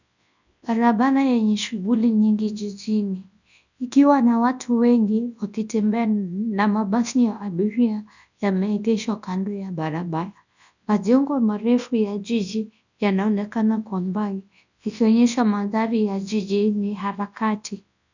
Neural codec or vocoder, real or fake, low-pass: codec, 24 kHz, 0.9 kbps, WavTokenizer, large speech release; fake; 7.2 kHz